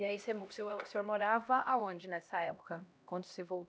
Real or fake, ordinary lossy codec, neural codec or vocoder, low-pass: fake; none; codec, 16 kHz, 1 kbps, X-Codec, HuBERT features, trained on LibriSpeech; none